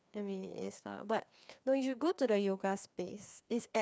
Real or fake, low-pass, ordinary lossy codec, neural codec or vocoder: fake; none; none; codec, 16 kHz, 2 kbps, FreqCodec, larger model